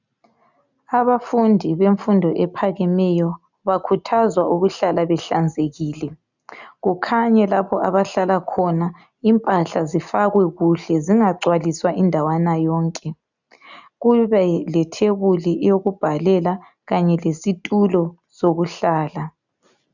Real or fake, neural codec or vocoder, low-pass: real; none; 7.2 kHz